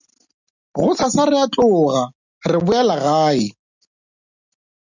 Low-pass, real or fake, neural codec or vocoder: 7.2 kHz; real; none